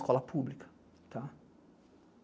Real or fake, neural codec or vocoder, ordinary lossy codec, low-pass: real; none; none; none